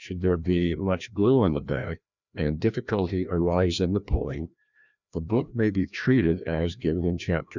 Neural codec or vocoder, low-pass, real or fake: codec, 16 kHz, 1 kbps, FreqCodec, larger model; 7.2 kHz; fake